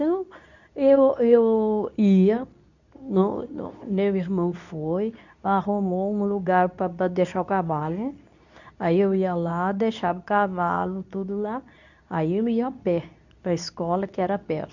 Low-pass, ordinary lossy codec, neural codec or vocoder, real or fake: 7.2 kHz; none; codec, 24 kHz, 0.9 kbps, WavTokenizer, medium speech release version 2; fake